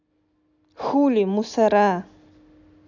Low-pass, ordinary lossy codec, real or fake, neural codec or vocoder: 7.2 kHz; none; real; none